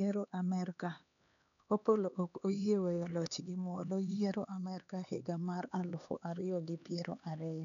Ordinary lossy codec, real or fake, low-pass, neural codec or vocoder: none; fake; 7.2 kHz; codec, 16 kHz, 2 kbps, X-Codec, HuBERT features, trained on LibriSpeech